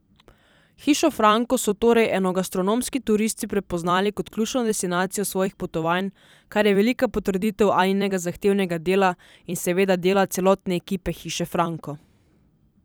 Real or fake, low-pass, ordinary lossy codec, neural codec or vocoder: fake; none; none; vocoder, 44.1 kHz, 128 mel bands every 256 samples, BigVGAN v2